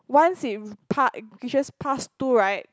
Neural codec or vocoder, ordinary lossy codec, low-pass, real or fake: none; none; none; real